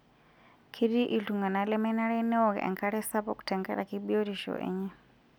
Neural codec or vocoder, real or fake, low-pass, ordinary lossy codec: none; real; none; none